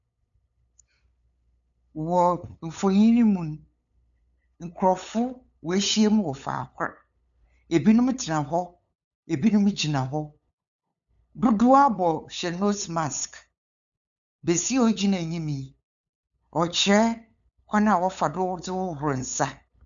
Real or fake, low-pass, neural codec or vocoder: fake; 7.2 kHz; codec, 16 kHz, 8 kbps, FunCodec, trained on LibriTTS, 25 frames a second